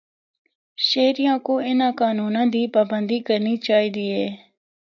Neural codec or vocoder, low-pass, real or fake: none; 7.2 kHz; real